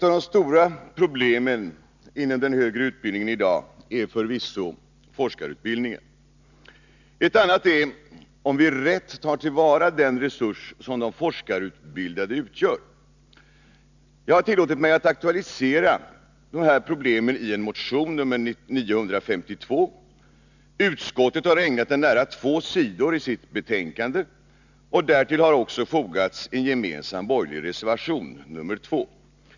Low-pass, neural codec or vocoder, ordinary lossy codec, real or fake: 7.2 kHz; none; none; real